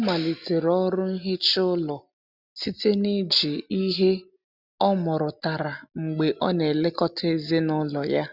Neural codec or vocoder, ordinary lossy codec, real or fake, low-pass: none; none; real; 5.4 kHz